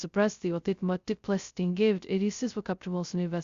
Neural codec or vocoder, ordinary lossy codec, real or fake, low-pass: codec, 16 kHz, 0.2 kbps, FocalCodec; Opus, 64 kbps; fake; 7.2 kHz